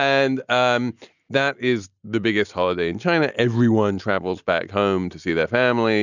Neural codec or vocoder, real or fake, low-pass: none; real; 7.2 kHz